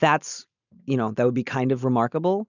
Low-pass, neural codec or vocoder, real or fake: 7.2 kHz; none; real